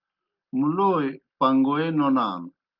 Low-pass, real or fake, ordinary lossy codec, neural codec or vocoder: 5.4 kHz; real; Opus, 24 kbps; none